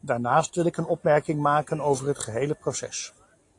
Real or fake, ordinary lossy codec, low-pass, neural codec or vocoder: real; AAC, 48 kbps; 10.8 kHz; none